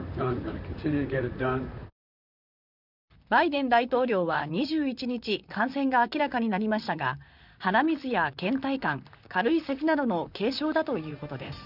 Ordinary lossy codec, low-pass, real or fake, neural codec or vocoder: none; 5.4 kHz; fake; vocoder, 44.1 kHz, 128 mel bands, Pupu-Vocoder